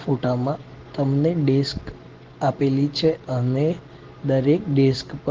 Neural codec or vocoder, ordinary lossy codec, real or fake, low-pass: none; Opus, 16 kbps; real; 7.2 kHz